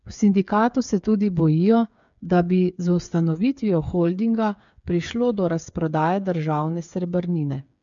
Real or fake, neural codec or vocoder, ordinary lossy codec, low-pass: fake; codec, 16 kHz, 8 kbps, FreqCodec, smaller model; MP3, 64 kbps; 7.2 kHz